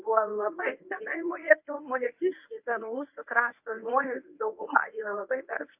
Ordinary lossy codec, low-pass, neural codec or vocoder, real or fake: AAC, 32 kbps; 3.6 kHz; codec, 24 kHz, 0.9 kbps, WavTokenizer, medium speech release version 1; fake